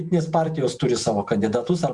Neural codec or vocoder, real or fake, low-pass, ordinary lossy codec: vocoder, 48 kHz, 128 mel bands, Vocos; fake; 10.8 kHz; Opus, 64 kbps